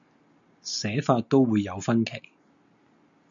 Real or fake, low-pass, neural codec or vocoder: real; 7.2 kHz; none